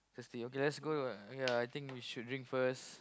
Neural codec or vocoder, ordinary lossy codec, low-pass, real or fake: none; none; none; real